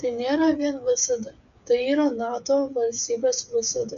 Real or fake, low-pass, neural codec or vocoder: fake; 7.2 kHz; codec, 16 kHz, 8 kbps, FreqCodec, smaller model